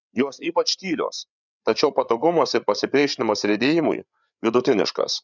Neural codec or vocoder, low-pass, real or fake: codec, 16 kHz, 8 kbps, FreqCodec, larger model; 7.2 kHz; fake